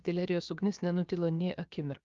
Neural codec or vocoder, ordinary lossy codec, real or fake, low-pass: codec, 16 kHz, about 1 kbps, DyCAST, with the encoder's durations; Opus, 32 kbps; fake; 7.2 kHz